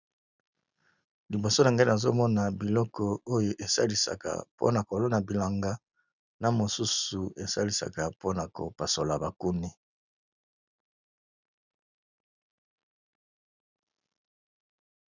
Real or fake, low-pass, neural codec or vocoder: real; 7.2 kHz; none